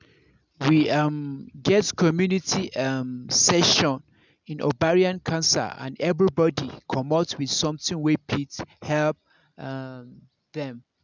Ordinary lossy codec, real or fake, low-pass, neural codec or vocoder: none; real; 7.2 kHz; none